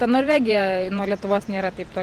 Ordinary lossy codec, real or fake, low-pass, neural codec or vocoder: Opus, 24 kbps; fake; 14.4 kHz; vocoder, 48 kHz, 128 mel bands, Vocos